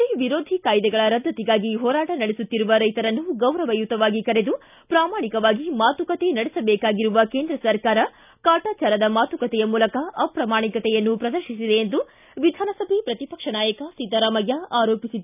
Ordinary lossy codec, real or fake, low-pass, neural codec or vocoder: none; real; 3.6 kHz; none